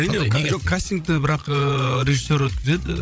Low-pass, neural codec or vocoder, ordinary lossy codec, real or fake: none; codec, 16 kHz, 16 kbps, FreqCodec, larger model; none; fake